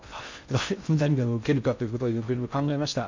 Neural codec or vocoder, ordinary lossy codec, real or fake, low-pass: codec, 16 kHz in and 24 kHz out, 0.6 kbps, FocalCodec, streaming, 4096 codes; MP3, 64 kbps; fake; 7.2 kHz